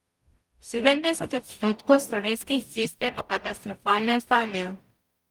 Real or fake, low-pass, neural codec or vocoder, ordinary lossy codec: fake; 19.8 kHz; codec, 44.1 kHz, 0.9 kbps, DAC; Opus, 32 kbps